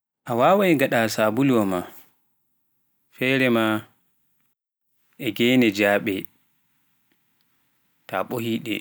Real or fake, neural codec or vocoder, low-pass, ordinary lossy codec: real; none; none; none